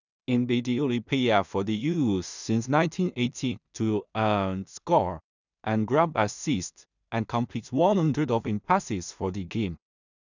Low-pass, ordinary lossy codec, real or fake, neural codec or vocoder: 7.2 kHz; none; fake; codec, 16 kHz in and 24 kHz out, 0.4 kbps, LongCat-Audio-Codec, two codebook decoder